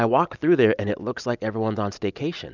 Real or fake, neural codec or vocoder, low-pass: real; none; 7.2 kHz